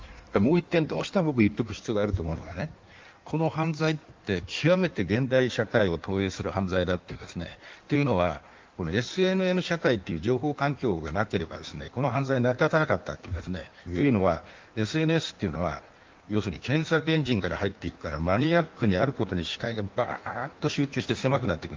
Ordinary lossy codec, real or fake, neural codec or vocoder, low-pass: Opus, 32 kbps; fake; codec, 16 kHz in and 24 kHz out, 1.1 kbps, FireRedTTS-2 codec; 7.2 kHz